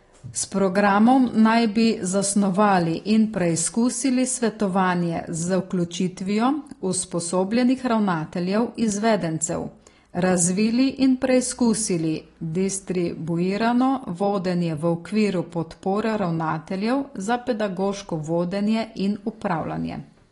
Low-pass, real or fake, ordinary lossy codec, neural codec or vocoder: 10.8 kHz; real; AAC, 32 kbps; none